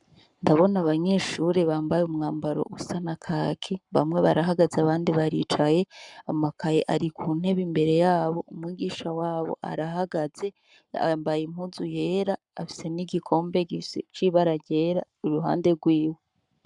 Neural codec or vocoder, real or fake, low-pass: codec, 44.1 kHz, 7.8 kbps, Pupu-Codec; fake; 10.8 kHz